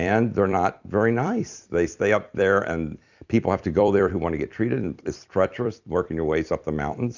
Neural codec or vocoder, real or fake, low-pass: vocoder, 44.1 kHz, 128 mel bands every 256 samples, BigVGAN v2; fake; 7.2 kHz